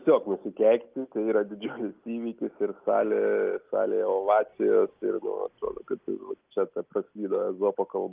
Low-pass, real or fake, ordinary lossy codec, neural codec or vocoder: 3.6 kHz; real; Opus, 24 kbps; none